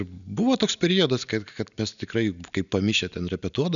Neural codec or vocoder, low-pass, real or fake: none; 7.2 kHz; real